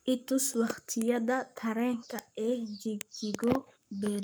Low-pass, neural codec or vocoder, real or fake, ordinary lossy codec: none; codec, 44.1 kHz, 7.8 kbps, Pupu-Codec; fake; none